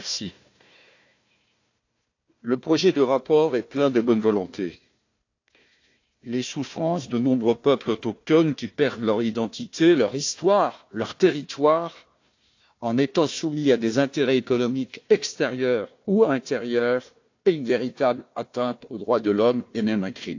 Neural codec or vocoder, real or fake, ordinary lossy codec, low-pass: codec, 16 kHz, 1 kbps, FunCodec, trained on Chinese and English, 50 frames a second; fake; AAC, 48 kbps; 7.2 kHz